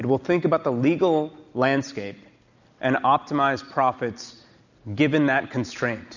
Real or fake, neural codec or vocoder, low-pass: real; none; 7.2 kHz